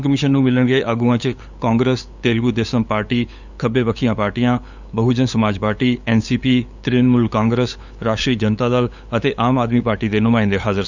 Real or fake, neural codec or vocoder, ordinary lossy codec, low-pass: fake; codec, 16 kHz, 8 kbps, FunCodec, trained on LibriTTS, 25 frames a second; none; 7.2 kHz